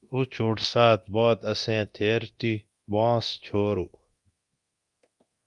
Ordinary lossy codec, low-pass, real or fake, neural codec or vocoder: Opus, 32 kbps; 10.8 kHz; fake; codec, 24 kHz, 0.9 kbps, DualCodec